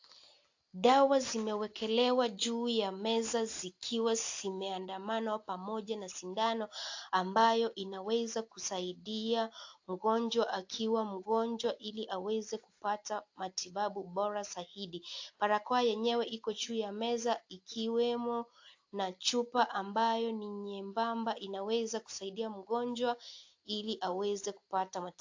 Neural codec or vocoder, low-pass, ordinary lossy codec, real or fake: none; 7.2 kHz; AAC, 48 kbps; real